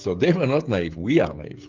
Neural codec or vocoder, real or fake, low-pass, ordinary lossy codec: codec, 16 kHz, 16 kbps, FreqCodec, smaller model; fake; 7.2 kHz; Opus, 24 kbps